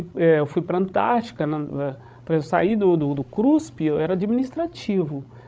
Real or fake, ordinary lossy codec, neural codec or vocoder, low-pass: fake; none; codec, 16 kHz, 16 kbps, FreqCodec, larger model; none